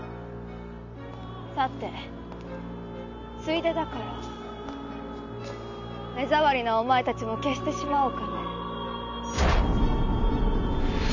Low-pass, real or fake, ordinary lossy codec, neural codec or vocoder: 7.2 kHz; real; none; none